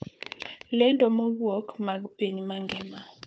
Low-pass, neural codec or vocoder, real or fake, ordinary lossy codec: none; codec, 16 kHz, 4 kbps, FunCodec, trained on Chinese and English, 50 frames a second; fake; none